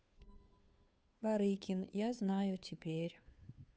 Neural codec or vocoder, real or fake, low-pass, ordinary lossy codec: codec, 16 kHz, 2 kbps, FunCodec, trained on Chinese and English, 25 frames a second; fake; none; none